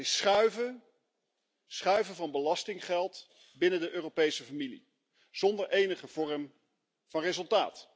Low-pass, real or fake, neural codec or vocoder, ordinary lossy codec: none; real; none; none